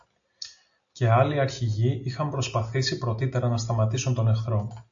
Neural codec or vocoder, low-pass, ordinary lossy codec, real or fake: none; 7.2 kHz; AAC, 64 kbps; real